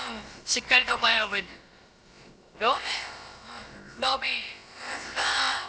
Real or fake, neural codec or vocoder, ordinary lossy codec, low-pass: fake; codec, 16 kHz, about 1 kbps, DyCAST, with the encoder's durations; none; none